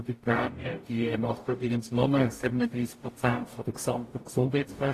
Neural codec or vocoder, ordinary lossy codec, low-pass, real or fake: codec, 44.1 kHz, 0.9 kbps, DAC; AAC, 48 kbps; 14.4 kHz; fake